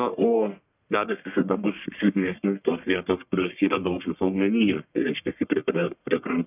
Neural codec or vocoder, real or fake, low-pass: codec, 44.1 kHz, 1.7 kbps, Pupu-Codec; fake; 3.6 kHz